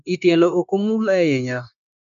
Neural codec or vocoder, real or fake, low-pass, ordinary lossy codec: codec, 16 kHz, 0.9 kbps, LongCat-Audio-Codec; fake; 7.2 kHz; none